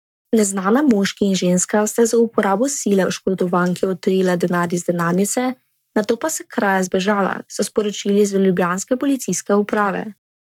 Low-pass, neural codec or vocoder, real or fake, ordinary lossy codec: 19.8 kHz; codec, 44.1 kHz, 7.8 kbps, Pupu-Codec; fake; none